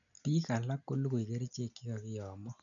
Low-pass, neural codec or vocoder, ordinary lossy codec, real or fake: 7.2 kHz; none; none; real